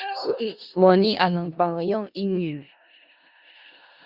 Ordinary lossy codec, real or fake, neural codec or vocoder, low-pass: Opus, 64 kbps; fake; codec, 16 kHz in and 24 kHz out, 0.4 kbps, LongCat-Audio-Codec, four codebook decoder; 5.4 kHz